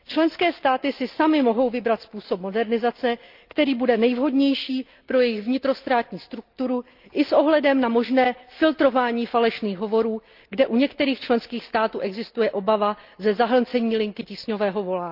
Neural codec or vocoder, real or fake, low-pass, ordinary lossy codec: none; real; 5.4 kHz; Opus, 24 kbps